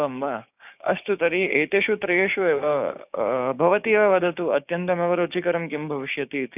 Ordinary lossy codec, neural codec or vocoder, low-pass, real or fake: none; codec, 16 kHz, 6 kbps, DAC; 3.6 kHz; fake